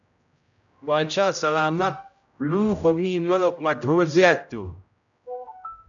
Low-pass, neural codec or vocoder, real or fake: 7.2 kHz; codec, 16 kHz, 0.5 kbps, X-Codec, HuBERT features, trained on general audio; fake